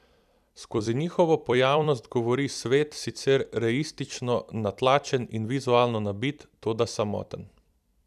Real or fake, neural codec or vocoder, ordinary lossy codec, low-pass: fake; vocoder, 44.1 kHz, 128 mel bands every 256 samples, BigVGAN v2; none; 14.4 kHz